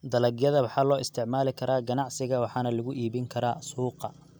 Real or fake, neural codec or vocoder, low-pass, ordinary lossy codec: real; none; none; none